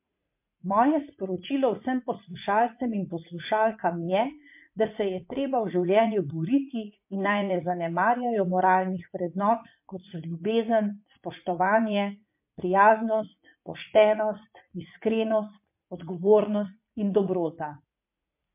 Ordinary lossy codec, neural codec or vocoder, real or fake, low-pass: MP3, 32 kbps; vocoder, 44.1 kHz, 80 mel bands, Vocos; fake; 3.6 kHz